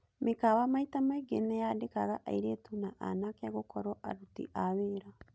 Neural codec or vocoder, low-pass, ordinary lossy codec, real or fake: none; none; none; real